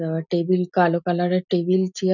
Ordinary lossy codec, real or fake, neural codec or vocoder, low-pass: none; real; none; 7.2 kHz